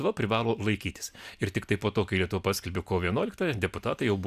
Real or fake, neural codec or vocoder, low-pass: real; none; 14.4 kHz